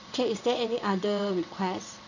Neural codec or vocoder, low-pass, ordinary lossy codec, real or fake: vocoder, 22.05 kHz, 80 mel bands, WaveNeXt; 7.2 kHz; none; fake